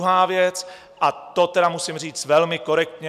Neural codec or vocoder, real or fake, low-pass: none; real; 14.4 kHz